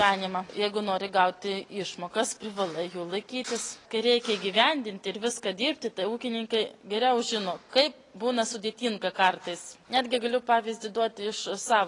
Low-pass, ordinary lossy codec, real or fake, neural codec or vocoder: 10.8 kHz; AAC, 32 kbps; real; none